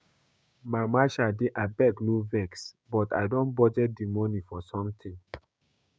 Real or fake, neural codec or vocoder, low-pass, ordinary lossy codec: fake; codec, 16 kHz, 6 kbps, DAC; none; none